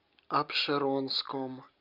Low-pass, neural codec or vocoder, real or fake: 5.4 kHz; none; real